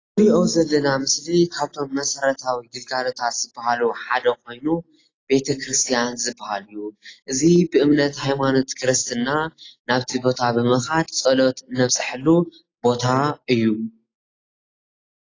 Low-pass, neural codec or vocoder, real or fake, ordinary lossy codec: 7.2 kHz; none; real; AAC, 32 kbps